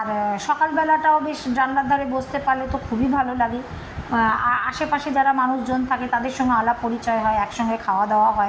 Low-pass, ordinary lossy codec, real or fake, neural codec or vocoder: none; none; real; none